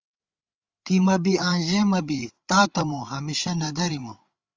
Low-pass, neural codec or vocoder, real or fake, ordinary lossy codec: 7.2 kHz; codec, 16 kHz, 8 kbps, FreqCodec, larger model; fake; Opus, 32 kbps